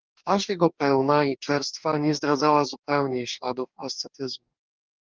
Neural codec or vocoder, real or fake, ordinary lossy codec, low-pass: codec, 44.1 kHz, 2.6 kbps, DAC; fake; Opus, 24 kbps; 7.2 kHz